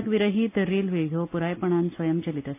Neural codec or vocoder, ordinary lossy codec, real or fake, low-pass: none; AAC, 24 kbps; real; 3.6 kHz